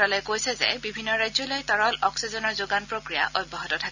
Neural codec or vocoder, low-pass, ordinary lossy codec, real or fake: none; 7.2 kHz; none; real